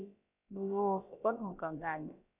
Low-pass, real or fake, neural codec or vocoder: 3.6 kHz; fake; codec, 16 kHz, about 1 kbps, DyCAST, with the encoder's durations